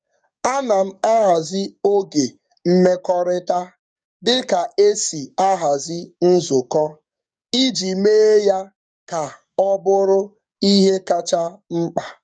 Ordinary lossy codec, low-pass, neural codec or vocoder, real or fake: none; 9.9 kHz; codec, 44.1 kHz, 7.8 kbps, DAC; fake